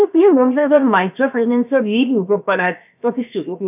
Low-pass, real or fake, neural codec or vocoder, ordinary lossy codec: 3.6 kHz; fake; codec, 16 kHz, about 1 kbps, DyCAST, with the encoder's durations; none